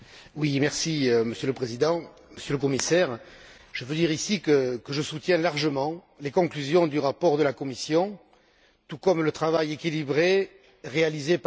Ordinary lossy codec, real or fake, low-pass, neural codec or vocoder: none; real; none; none